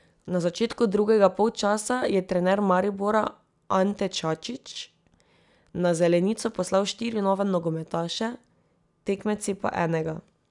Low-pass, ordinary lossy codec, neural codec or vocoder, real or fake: 10.8 kHz; none; none; real